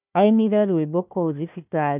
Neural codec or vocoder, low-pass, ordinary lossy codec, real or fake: codec, 16 kHz, 1 kbps, FunCodec, trained on Chinese and English, 50 frames a second; 3.6 kHz; none; fake